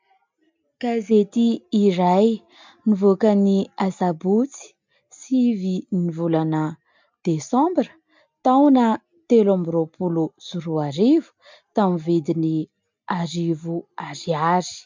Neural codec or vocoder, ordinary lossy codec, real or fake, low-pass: none; MP3, 64 kbps; real; 7.2 kHz